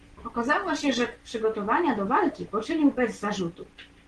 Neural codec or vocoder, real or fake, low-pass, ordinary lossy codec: none; real; 9.9 kHz; Opus, 16 kbps